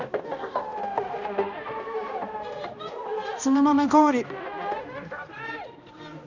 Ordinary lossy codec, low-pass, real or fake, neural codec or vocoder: none; 7.2 kHz; fake; codec, 16 kHz, 1 kbps, X-Codec, HuBERT features, trained on balanced general audio